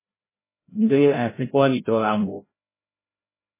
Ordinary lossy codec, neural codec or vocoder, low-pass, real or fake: MP3, 16 kbps; codec, 16 kHz, 0.5 kbps, FreqCodec, larger model; 3.6 kHz; fake